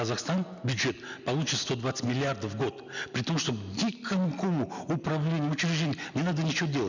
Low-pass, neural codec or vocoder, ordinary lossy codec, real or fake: 7.2 kHz; none; none; real